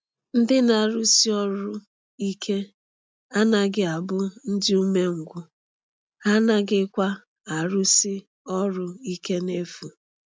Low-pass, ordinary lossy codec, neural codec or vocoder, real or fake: none; none; none; real